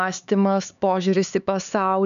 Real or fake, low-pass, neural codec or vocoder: fake; 7.2 kHz; codec, 16 kHz, 4 kbps, X-Codec, HuBERT features, trained on LibriSpeech